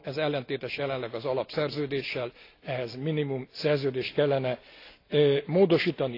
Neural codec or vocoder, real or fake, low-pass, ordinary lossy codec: none; real; 5.4 kHz; AAC, 32 kbps